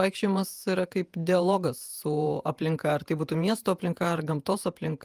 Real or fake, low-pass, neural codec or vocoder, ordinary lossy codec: fake; 14.4 kHz; vocoder, 48 kHz, 128 mel bands, Vocos; Opus, 32 kbps